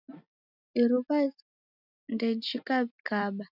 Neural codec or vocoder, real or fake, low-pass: none; real; 5.4 kHz